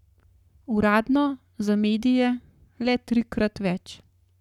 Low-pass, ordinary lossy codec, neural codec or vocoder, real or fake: 19.8 kHz; none; codec, 44.1 kHz, 7.8 kbps, Pupu-Codec; fake